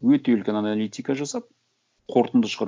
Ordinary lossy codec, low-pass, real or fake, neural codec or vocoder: none; none; real; none